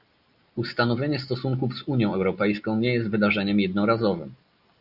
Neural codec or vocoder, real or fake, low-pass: none; real; 5.4 kHz